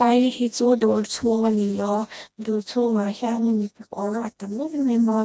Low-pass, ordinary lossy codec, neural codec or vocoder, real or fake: none; none; codec, 16 kHz, 1 kbps, FreqCodec, smaller model; fake